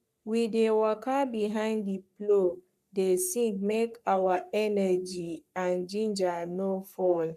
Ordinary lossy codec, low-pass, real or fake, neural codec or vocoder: none; 14.4 kHz; fake; codec, 44.1 kHz, 3.4 kbps, Pupu-Codec